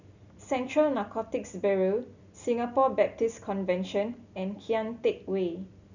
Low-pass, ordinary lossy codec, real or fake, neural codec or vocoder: 7.2 kHz; none; real; none